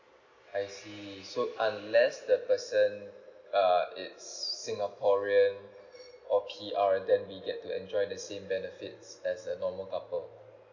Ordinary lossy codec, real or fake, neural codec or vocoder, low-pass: none; real; none; 7.2 kHz